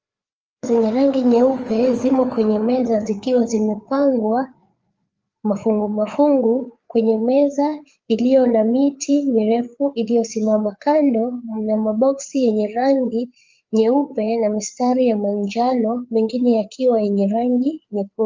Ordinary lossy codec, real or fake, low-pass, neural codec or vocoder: Opus, 24 kbps; fake; 7.2 kHz; codec, 16 kHz, 4 kbps, FreqCodec, larger model